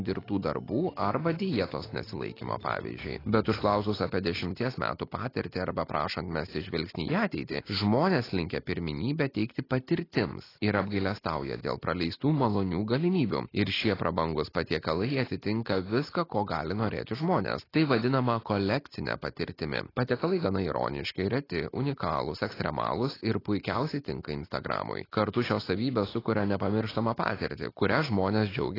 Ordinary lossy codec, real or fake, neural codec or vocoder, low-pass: AAC, 24 kbps; real; none; 5.4 kHz